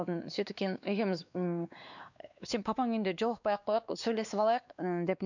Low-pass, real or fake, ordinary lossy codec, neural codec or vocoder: 7.2 kHz; fake; none; codec, 16 kHz, 2 kbps, X-Codec, WavLM features, trained on Multilingual LibriSpeech